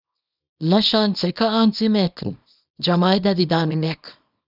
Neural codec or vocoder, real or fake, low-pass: codec, 24 kHz, 0.9 kbps, WavTokenizer, small release; fake; 5.4 kHz